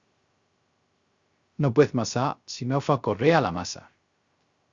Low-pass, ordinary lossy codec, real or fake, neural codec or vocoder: 7.2 kHz; Opus, 64 kbps; fake; codec, 16 kHz, 0.3 kbps, FocalCodec